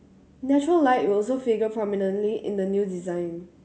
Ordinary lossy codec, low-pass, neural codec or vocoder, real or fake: none; none; none; real